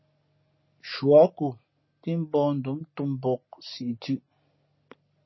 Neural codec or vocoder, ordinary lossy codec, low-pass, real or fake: none; MP3, 24 kbps; 7.2 kHz; real